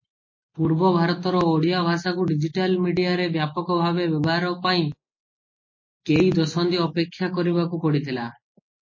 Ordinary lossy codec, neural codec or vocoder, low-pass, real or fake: MP3, 32 kbps; none; 7.2 kHz; real